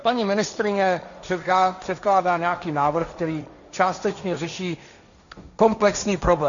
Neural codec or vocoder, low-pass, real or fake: codec, 16 kHz, 1.1 kbps, Voila-Tokenizer; 7.2 kHz; fake